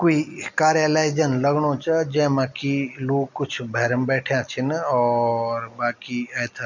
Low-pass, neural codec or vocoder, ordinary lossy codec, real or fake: 7.2 kHz; none; none; real